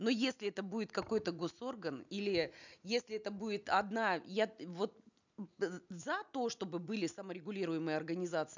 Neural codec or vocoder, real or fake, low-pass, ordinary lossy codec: none; real; 7.2 kHz; none